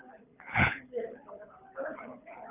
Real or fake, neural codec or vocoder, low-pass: fake; codec, 24 kHz, 6 kbps, HILCodec; 3.6 kHz